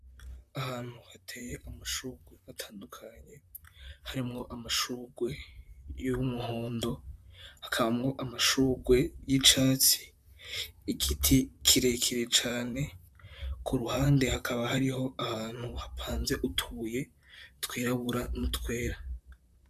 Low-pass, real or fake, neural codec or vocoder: 14.4 kHz; fake; vocoder, 44.1 kHz, 128 mel bands, Pupu-Vocoder